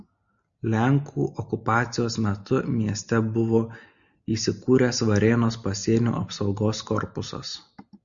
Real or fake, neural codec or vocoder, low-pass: real; none; 7.2 kHz